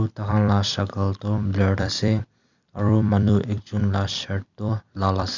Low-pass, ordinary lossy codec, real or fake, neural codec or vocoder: 7.2 kHz; none; fake; vocoder, 44.1 kHz, 128 mel bands every 256 samples, BigVGAN v2